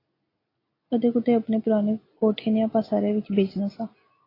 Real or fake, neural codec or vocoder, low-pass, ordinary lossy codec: real; none; 5.4 kHz; AAC, 24 kbps